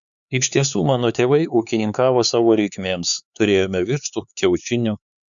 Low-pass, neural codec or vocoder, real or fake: 7.2 kHz; codec, 16 kHz, 4 kbps, X-Codec, HuBERT features, trained on LibriSpeech; fake